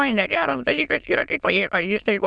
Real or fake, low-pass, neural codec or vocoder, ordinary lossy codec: fake; 9.9 kHz; autoencoder, 22.05 kHz, a latent of 192 numbers a frame, VITS, trained on many speakers; Opus, 64 kbps